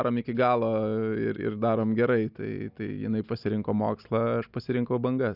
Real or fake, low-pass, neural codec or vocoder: real; 5.4 kHz; none